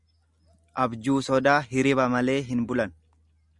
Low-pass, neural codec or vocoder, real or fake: 10.8 kHz; none; real